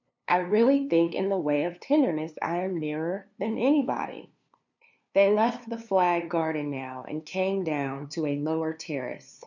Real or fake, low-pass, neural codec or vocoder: fake; 7.2 kHz; codec, 16 kHz, 2 kbps, FunCodec, trained on LibriTTS, 25 frames a second